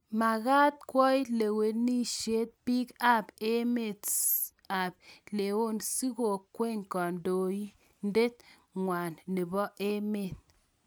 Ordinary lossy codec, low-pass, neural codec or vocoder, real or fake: none; none; none; real